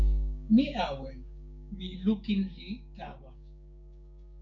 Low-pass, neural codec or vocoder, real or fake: 7.2 kHz; codec, 16 kHz, 6 kbps, DAC; fake